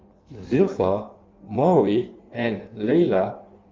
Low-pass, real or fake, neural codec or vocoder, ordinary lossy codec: 7.2 kHz; fake; codec, 16 kHz in and 24 kHz out, 1.1 kbps, FireRedTTS-2 codec; Opus, 32 kbps